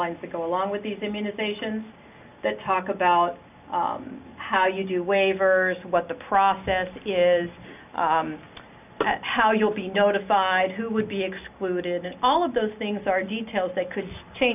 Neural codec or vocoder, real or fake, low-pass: none; real; 3.6 kHz